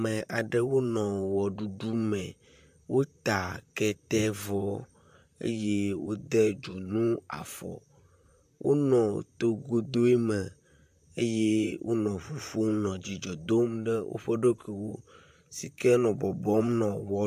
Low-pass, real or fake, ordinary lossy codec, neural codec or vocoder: 14.4 kHz; fake; AAC, 96 kbps; vocoder, 44.1 kHz, 128 mel bands, Pupu-Vocoder